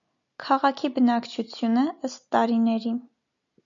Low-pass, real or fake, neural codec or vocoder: 7.2 kHz; real; none